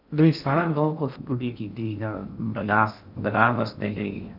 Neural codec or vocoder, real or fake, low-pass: codec, 16 kHz in and 24 kHz out, 0.6 kbps, FocalCodec, streaming, 2048 codes; fake; 5.4 kHz